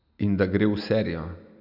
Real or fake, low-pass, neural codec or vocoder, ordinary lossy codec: real; 5.4 kHz; none; none